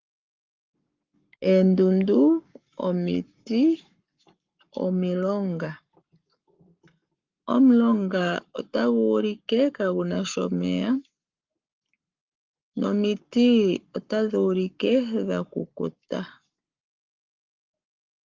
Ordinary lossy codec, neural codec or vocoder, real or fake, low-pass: Opus, 32 kbps; codec, 44.1 kHz, 7.8 kbps, Pupu-Codec; fake; 7.2 kHz